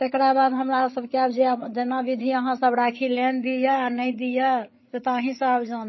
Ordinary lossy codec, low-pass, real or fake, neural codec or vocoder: MP3, 24 kbps; 7.2 kHz; fake; codec, 16 kHz, 8 kbps, FreqCodec, larger model